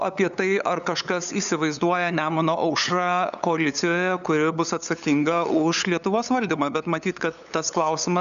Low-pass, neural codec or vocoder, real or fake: 7.2 kHz; codec, 16 kHz, 8 kbps, FunCodec, trained on LibriTTS, 25 frames a second; fake